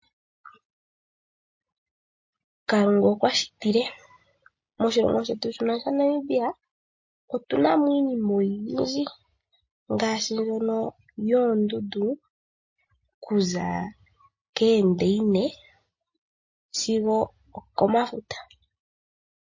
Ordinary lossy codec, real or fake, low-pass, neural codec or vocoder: MP3, 32 kbps; real; 7.2 kHz; none